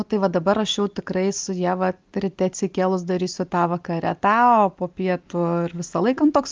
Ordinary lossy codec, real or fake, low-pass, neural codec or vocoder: Opus, 24 kbps; real; 7.2 kHz; none